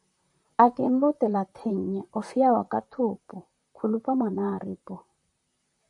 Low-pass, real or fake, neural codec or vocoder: 10.8 kHz; fake; vocoder, 44.1 kHz, 128 mel bands, Pupu-Vocoder